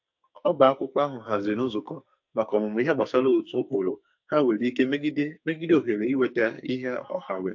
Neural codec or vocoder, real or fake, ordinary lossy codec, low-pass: codec, 44.1 kHz, 2.6 kbps, SNAC; fake; AAC, 48 kbps; 7.2 kHz